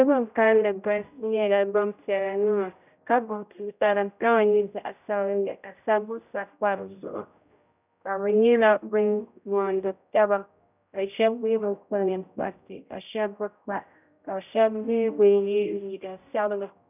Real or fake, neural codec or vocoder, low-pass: fake; codec, 16 kHz, 0.5 kbps, X-Codec, HuBERT features, trained on general audio; 3.6 kHz